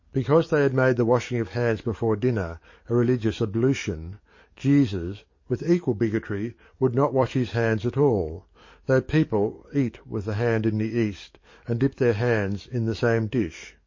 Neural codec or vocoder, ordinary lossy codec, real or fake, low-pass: codec, 44.1 kHz, 7.8 kbps, DAC; MP3, 32 kbps; fake; 7.2 kHz